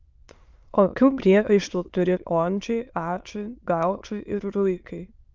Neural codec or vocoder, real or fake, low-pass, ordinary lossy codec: autoencoder, 22.05 kHz, a latent of 192 numbers a frame, VITS, trained on many speakers; fake; 7.2 kHz; Opus, 24 kbps